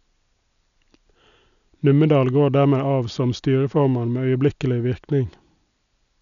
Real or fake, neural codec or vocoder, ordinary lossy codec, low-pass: real; none; none; 7.2 kHz